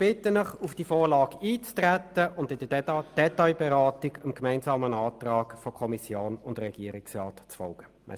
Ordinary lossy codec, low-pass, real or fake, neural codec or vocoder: Opus, 32 kbps; 14.4 kHz; real; none